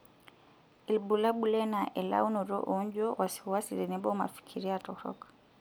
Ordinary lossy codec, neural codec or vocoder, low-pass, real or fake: none; none; none; real